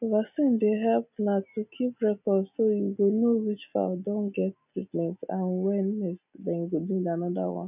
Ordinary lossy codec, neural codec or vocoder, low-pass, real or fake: none; none; 3.6 kHz; real